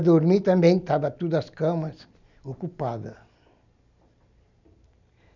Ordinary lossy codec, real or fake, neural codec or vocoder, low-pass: none; real; none; 7.2 kHz